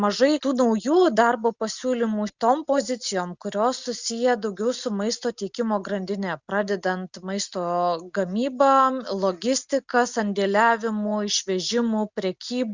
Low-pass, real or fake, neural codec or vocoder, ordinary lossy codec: 7.2 kHz; real; none; Opus, 64 kbps